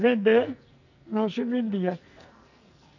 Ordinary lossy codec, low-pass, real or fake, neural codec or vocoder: none; 7.2 kHz; fake; codec, 44.1 kHz, 2.6 kbps, SNAC